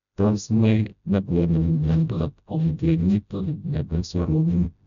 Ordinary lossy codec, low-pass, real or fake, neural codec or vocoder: none; 7.2 kHz; fake; codec, 16 kHz, 0.5 kbps, FreqCodec, smaller model